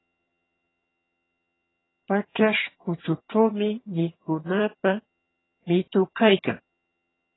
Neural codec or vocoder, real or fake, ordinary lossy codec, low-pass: vocoder, 22.05 kHz, 80 mel bands, HiFi-GAN; fake; AAC, 16 kbps; 7.2 kHz